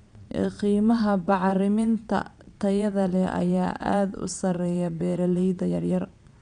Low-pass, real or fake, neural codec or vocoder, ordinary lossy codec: 9.9 kHz; fake; vocoder, 22.05 kHz, 80 mel bands, Vocos; none